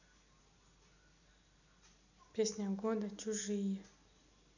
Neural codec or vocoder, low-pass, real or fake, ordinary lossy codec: none; 7.2 kHz; real; none